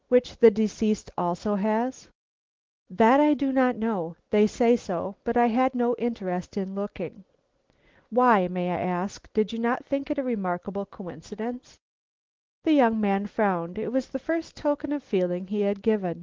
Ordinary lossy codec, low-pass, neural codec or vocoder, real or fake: Opus, 16 kbps; 7.2 kHz; none; real